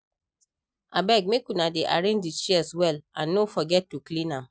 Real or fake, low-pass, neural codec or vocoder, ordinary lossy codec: real; none; none; none